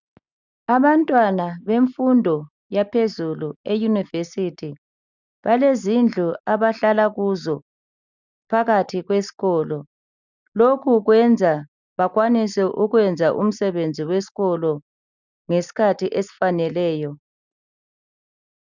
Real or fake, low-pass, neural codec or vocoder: real; 7.2 kHz; none